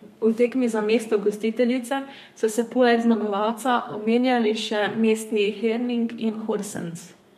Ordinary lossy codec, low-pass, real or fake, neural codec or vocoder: MP3, 64 kbps; 14.4 kHz; fake; codec, 32 kHz, 1.9 kbps, SNAC